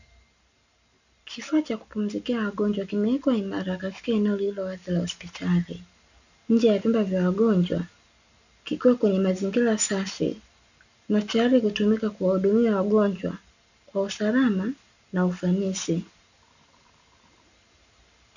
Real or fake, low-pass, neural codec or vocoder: real; 7.2 kHz; none